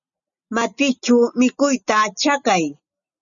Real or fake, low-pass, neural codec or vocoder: real; 7.2 kHz; none